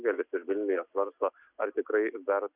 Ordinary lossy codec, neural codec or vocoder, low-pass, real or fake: Opus, 64 kbps; none; 3.6 kHz; real